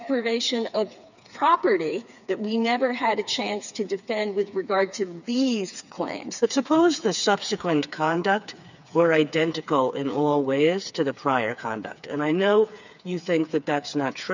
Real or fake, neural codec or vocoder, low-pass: fake; codec, 16 kHz, 4 kbps, FreqCodec, smaller model; 7.2 kHz